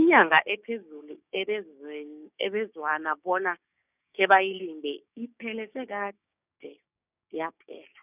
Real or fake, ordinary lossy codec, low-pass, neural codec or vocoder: fake; none; 3.6 kHz; codec, 16 kHz, 6 kbps, DAC